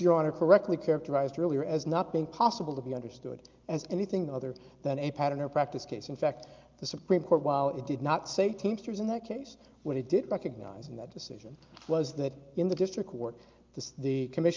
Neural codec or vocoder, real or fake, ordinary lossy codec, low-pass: none; real; Opus, 24 kbps; 7.2 kHz